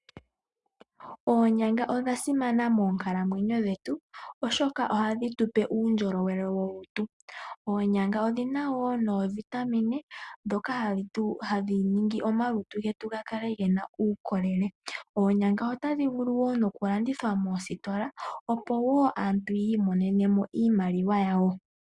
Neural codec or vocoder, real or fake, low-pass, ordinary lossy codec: none; real; 10.8 kHz; Opus, 64 kbps